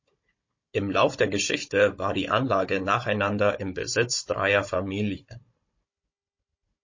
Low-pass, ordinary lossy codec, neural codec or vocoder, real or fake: 7.2 kHz; MP3, 32 kbps; codec, 16 kHz, 16 kbps, FunCodec, trained on Chinese and English, 50 frames a second; fake